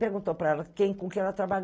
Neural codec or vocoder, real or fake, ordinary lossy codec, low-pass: none; real; none; none